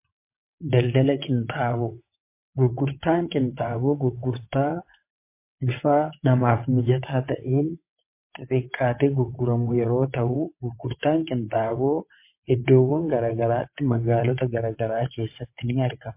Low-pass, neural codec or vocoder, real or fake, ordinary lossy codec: 3.6 kHz; vocoder, 22.05 kHz, 80 mel bands, WaveNeXt; fake; MP3, 24 kbps